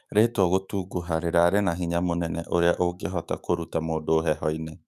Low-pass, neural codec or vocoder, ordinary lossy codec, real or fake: 14.4 kHz; autoencoder, 48 kHz, 128 numbers a frame, DAC-VAE, trained on Japanese speech; none; fake